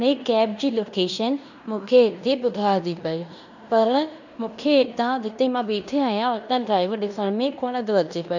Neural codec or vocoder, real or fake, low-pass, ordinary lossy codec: codec, 16 kHz in and 24 kHz out, 0.9 kbps, LongCat-Audio-Codec, fine tuned four codebook decoder; fake; 7.2 kHz; none